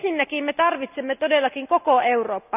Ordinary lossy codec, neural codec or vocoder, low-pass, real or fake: none; none; 3.6 kHz; real